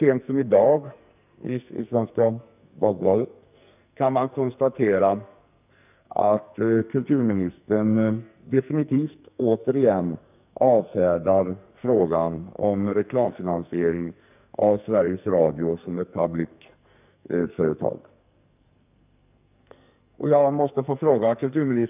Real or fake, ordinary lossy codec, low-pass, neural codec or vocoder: fake; none; 3.6 kHz; codec, 44.1 kHz, 2.6 kbps, SNAC